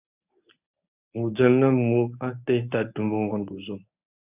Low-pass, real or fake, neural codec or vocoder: 3.6 kHz; fake; codec, 24 kHz, 0.9 kbps, WavTokenizer, medium speech release version 1